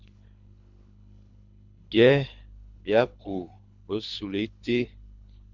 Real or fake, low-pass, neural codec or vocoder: fake; 7.2 kHz; codec, 24 kHz, 3 kbps, HILCodec